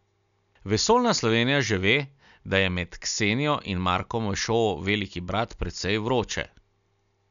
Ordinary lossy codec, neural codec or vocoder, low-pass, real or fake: none; none; 7.2 kHz; real